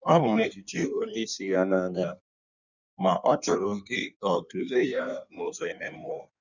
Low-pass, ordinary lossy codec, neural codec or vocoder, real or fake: 7.2 kHz; none; codec, 16 kHz in and 24 kHz out, 1.1 kbps, FireRedTTS-2 codec; fake